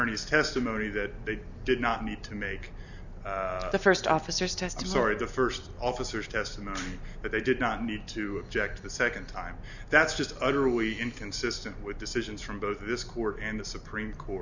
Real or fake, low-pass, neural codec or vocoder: real; 7.2 kHz; none